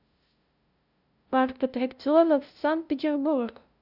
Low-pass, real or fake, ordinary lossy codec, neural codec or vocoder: 5.4 kHz; fake; none; codec, 16 kHz, 0.5 kbps, FunCodec, trained on LibriTTS, 25 frames a second